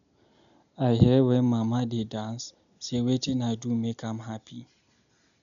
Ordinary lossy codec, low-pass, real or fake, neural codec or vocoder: none; 7.2 kHz; real; none